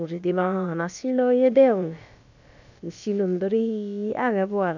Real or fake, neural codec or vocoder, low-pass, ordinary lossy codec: fake; codec, 16 kHz, about 1 kbps, DyCAST, with the encoder's durations; 7.2 kHz; none